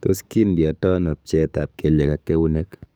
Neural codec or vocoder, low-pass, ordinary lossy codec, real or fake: codec, 44.1 kHz, 7.8 kbps, DAC; none; none; fake